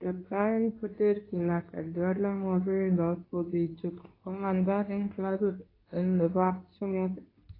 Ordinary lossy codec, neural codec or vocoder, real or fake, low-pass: AAC, 24 kbps; codec, 24 kHz, 0.9 kbps, WavTokenizer, medium speech release version 2; fake; 5.4 kHz